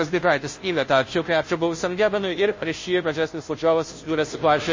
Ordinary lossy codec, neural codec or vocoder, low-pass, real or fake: MP3, 32 kbps; codec, 16 kHz, 0.5 kbps, FunCodec, trained on Chinese and English, 25 frames a second; 7.2 kHz; fake